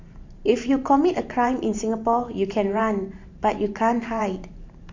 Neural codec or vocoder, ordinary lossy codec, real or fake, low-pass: vocoder, 44.1 kHz, 128 mel bands every 512 samples, BigVGAN v2; MP3, 48 kbps; fake; 7.2 kHz